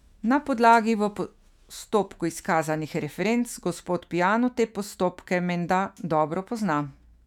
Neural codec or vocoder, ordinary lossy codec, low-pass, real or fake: autoencoder, 48 kHz, 128 numbers a frame, DAC-VAE, trained on Japanese speech; none; 19.8 kHz; fake